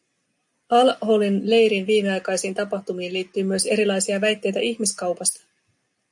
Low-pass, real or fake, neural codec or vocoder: 10.8 kHz; real; none